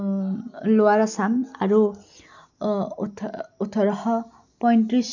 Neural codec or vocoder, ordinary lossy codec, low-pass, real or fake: none; none; 7.2 kHz; real